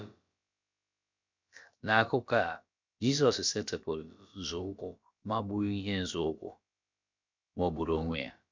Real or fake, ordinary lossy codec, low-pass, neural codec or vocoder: fake; MP3, 64 kbps; 7.2 kHz; codec, 16 kHz, about 1 kbps, DyCAST, with the encoder's durations